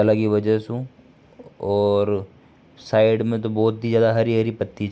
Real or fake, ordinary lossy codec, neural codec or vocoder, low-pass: real; none; none; none